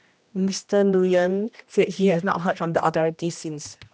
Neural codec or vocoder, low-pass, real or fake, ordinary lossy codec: codec, 16 kHz, 1 kbps, X-Codec, HuBERT features, trained on general audio; none; fake; none